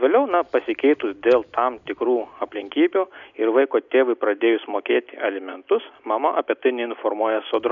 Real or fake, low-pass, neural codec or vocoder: real; 7.2 kHz; none